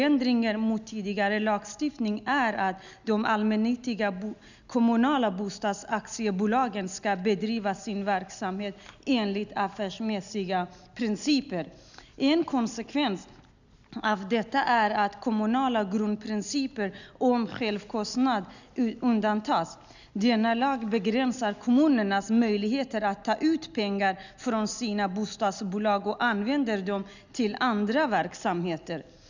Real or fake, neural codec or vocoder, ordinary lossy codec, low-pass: real; none; none; 7.2 kHz